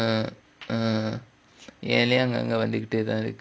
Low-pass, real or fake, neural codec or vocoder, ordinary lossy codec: none; real; none; none